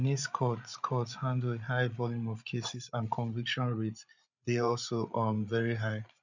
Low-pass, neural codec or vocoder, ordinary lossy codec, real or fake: 7.2 kHz; codec, 16 kHz, 16 kbps, FreqCodec, larger model; none; fake